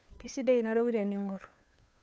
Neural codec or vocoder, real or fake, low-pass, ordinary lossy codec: codec, 16 kHz, 2 kbps, FunCodec, trained on Chinese and English, 25 frames a second; fake; none; none